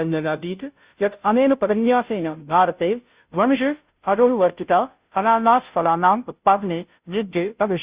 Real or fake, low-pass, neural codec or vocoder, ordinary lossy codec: fake; 3.6 kHz; codec, 16 kHz, 0.5 kbps, FunCodec, trained on Chinese and English, 25 frames a second; Opus, 64 kbps